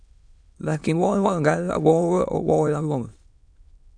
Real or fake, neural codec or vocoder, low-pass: fake; autoencoder, 22.05 kHz, a latent of 192 numbers a frame, VITS, trained on many speakers; 9.9 kHz